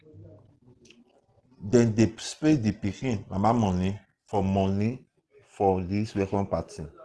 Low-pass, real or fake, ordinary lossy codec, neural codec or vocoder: 10.8 kHz; real; Opus, 16 kbps; none